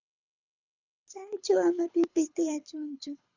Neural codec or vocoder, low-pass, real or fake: codec, 24 kHz, 6 kbps, HILCodec; 7.2 kHz; fake